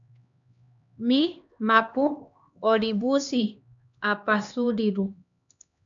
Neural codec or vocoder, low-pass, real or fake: codec, 16 kHz, 2 kbps, X-Codec, HuBERT features, trained on LibriSpeech; 7.2 kHz; fake